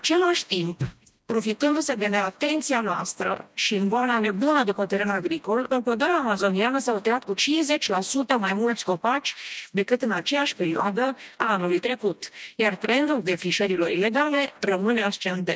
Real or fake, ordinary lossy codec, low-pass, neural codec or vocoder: fake; none; none; codec, 16 kHz, 1 kbps, FreqCodec, smaller model